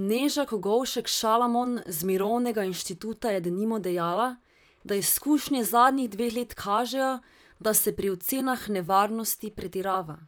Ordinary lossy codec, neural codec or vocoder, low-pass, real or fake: none; vocoder, 44.1 kHz, 128 mel bands, Pupu-Vocoder; none; fake